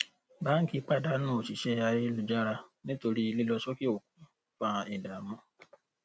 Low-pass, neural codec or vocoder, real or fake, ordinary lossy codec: none; none; real; none